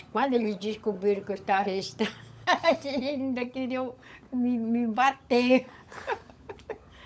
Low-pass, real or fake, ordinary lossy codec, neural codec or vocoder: none; fake; none; codec, 16 kHz, 16 kbps, FunCodec, trained on LibriTTS, 50 frames a second